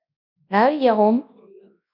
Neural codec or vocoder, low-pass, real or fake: codec, 24 kHz, 0.9 kbps, WavTokenizer, large speech release; 5.4 kHz; fake